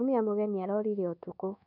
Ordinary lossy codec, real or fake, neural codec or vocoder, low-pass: none; fake; codec, 24 kHz, 1.2 kbps, DualCodec; 5.4 kHz